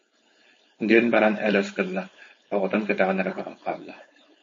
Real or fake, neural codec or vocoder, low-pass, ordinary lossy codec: fake; codec, 16 kHz, 4.8 kbps, FACodec; 7.2 kHz; MP3, 32 kbps